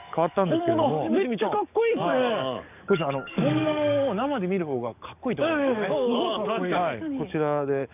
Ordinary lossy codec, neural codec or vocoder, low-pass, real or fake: none; codec, 44.1 kHz, 7.8 kbps, DAC; 3.6 kHz; fake